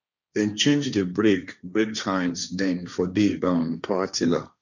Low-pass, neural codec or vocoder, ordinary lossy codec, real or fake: 7.2 kHz; codec, 16 kHz, 1.1 kbps, Voila-Tokenizer; none; fake